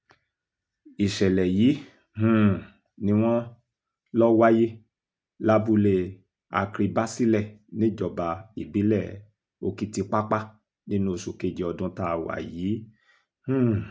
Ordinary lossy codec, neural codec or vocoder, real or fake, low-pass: none; none; real; none